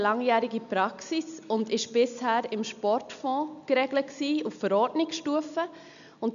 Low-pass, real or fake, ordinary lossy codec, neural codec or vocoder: 7.2 kHz; real; none; none